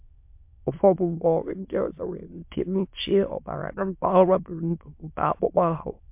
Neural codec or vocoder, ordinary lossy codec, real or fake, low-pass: autoencoder, 22.05 kHz, a latent of 192 numbers a frame, VITS, trained on many speakers; MP3, 32 kbps; fake; 3.6 kHz